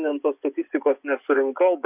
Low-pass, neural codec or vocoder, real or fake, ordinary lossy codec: 3.6 kHz; vocoder, 24 kHz, 100 mel bands, Vocos; fake; AAC, 32 kbps